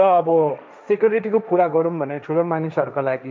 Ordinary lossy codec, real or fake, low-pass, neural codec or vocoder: none; fake; none; codec, 16 kHz, 1.1 kbps, Voila-Tokenizer